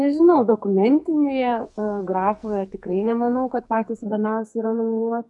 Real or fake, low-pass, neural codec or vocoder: fake; 10.8 kHz; codec, 44.1 kHz, 2.6 kbps, SNAC